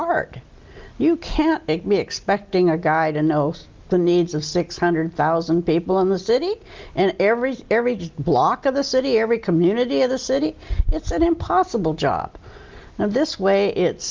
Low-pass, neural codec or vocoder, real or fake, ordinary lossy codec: 7.2 kHz; none; real; Opus, 24 kbps